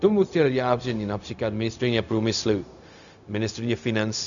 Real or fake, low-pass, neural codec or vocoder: fake; 7.2 kHz; codec, 16 kHz, 0.4 kbps, LongCat-Audio-Codec